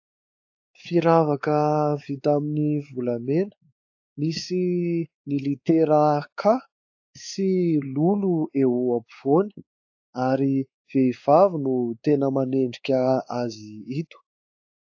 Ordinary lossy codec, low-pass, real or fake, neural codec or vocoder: AAC, 48 kbps; 7.2 kHz; fake; codec, 16 kHz, 4 kbps, X-Codec, WavLM features, trained on Multilingual LibriSpeech